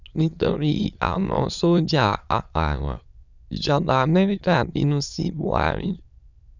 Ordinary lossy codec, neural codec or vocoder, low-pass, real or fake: none; autoencoder, 22.05 kHz, a latent of 192 numbers a frame, VITS, trained on many speakers; 7.2 kHz; fake